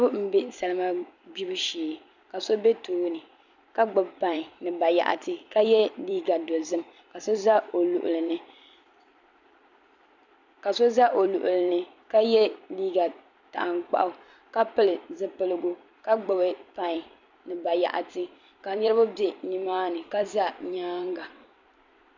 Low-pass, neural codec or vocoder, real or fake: 7.2 kHz; vocoder, 44.1 kHz, 128 mel bands every 256 samples, BigVGAN v2; fake